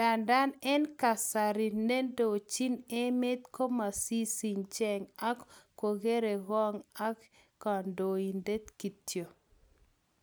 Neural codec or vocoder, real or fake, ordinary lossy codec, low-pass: none; real; none; none